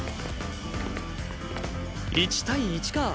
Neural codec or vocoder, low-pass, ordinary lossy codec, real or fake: none; none; none; real